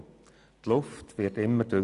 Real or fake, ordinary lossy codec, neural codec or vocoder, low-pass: real; MP3, 96 kbps; none; 10.8 kHz